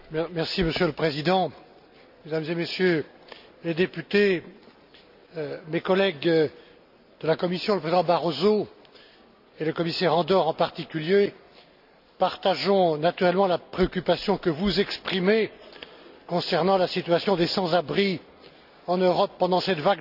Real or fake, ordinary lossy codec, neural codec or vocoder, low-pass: real; none; none; 5.4 kHz